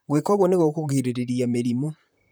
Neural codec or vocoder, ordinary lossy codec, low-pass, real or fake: vocoder, 44.1 kHz, 128 mel bands, Pupu-Vocoder; none; none; fake